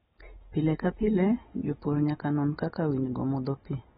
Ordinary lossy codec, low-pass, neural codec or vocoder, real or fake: AAC, 16 kbps; 7.2 kHz; none; real